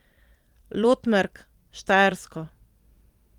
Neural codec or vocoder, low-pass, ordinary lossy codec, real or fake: vocoder, 44.1 kHz, 128 mel bands every 256 samples, BigVGAN v2; 19.8 kHz; Opus, 24 kbps; fake